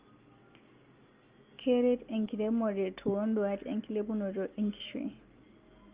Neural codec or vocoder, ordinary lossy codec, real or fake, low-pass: none; Opus, 24 kbps; real; 3.6 kHz